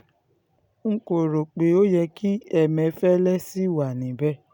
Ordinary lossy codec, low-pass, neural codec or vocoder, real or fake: none; 19.8 kHz; none; real